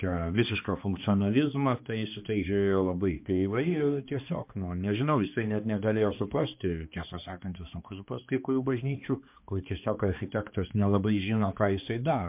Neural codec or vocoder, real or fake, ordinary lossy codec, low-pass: codec, 16 kHz, 2 kbps, X-Codec, HuBERT features, trained on balanced general audio; fake; MP3, 32 kbps; 3.6 kHz